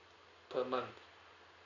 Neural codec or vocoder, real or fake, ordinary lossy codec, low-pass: none; real; none; 7.2 kHz